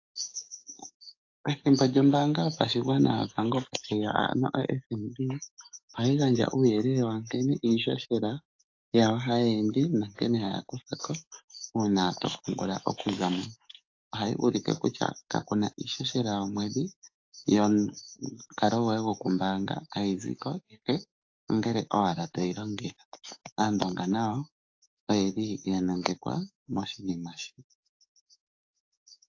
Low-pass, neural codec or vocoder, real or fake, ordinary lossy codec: 7.2 kHz; codec, 44.1 kHz, 7.8 kbps, DAC; fake; AAC, 48 kbps